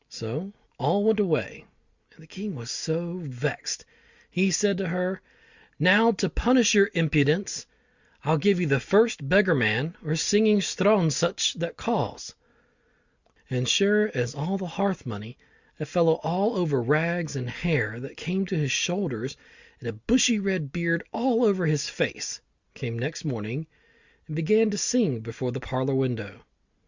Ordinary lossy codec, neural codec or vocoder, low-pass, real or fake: Opus, 64 kbps; none; 7.2 kHz; real